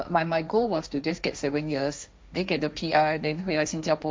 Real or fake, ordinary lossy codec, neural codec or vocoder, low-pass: fake; none; codec, 16 kHz, 1.1 kbps, Voila-Tokenizer; none